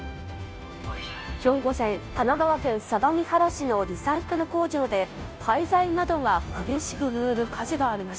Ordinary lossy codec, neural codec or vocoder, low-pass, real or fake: none; codec, 16 kHz, 0.5 kbps, FunCodec, trained on Chinese and English, 25 frames a second; none; fake